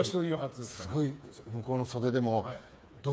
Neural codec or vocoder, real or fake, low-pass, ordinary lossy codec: codec, 16 kHz, 4 kbps, FreqCodec, smaller model; fake; none; none